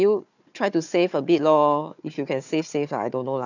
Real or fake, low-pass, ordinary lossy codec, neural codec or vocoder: fake; 7.2 kHz; none; codec, 16 kHz, 4 kbps, FunCodec, trained on Chinese and English, 50 frames a second